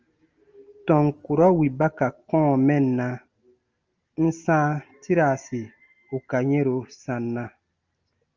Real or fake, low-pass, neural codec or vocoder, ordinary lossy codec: real; 7.2 kHz; none; Opus, 24 kbps